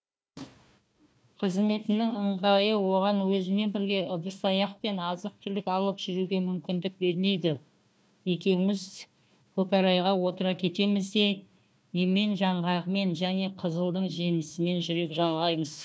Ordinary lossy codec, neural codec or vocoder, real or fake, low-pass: none; codec, 16 kHz, 1 kbps, FunCodec, trained on Chinese and English, 50 frames a second; fake; none